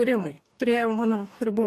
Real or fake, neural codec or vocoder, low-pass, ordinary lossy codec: fake; codec, 44.1 kHz, 2.6 kbps, DAC; 14.4 kHz; AAC, 64 kbps